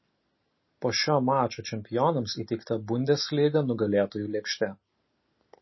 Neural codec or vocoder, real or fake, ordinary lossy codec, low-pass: none; real; MP3, 24 kbps; 7.2 kHz